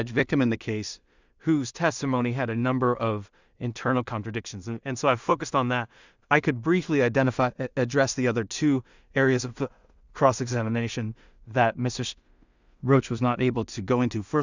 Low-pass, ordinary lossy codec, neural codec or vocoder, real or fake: 7.2 kHz; Opus, 64 kbps; codec, 16 kHz in and 24 kHz out, 0.4 kbps, LongCat-Audio-Codec, two codebook decoder; fake